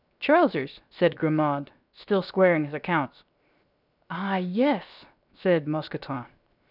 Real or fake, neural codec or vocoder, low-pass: fake; codec, 16 kHz, 0.7 kbps, FocalCodec; 5.4 kHz